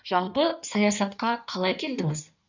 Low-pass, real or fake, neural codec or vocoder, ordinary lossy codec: 7.2 kHz; fake; codec, 16 kHz in and 24 kHz out, 1.1 kbps, FireRedTTS-2 codec; none